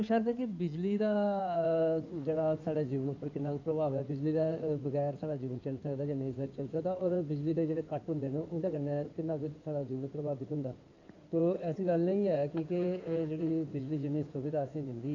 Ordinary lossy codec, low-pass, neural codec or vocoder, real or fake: none; 7.2 kHz; codec, 16 kHz in and 24 kHz out, 2.2 kbps, FireRedTTS-2 codec; fake